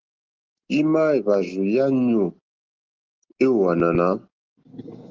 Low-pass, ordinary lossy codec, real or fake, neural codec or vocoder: 7.2 kHz; Opus, 16 kbps; real; none